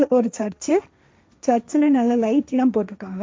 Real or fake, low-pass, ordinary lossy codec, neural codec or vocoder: fake; none; none; codec, 16 kHz, 1.1 kbps, Voila-Tokenizer